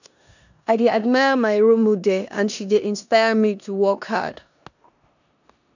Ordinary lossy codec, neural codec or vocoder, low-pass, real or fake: none; codec, 16 kHz in and 24 kHz out, 0.9 kbps, LongCat-Audio-Codec, four codebook decoder; 7.2 kHz; fake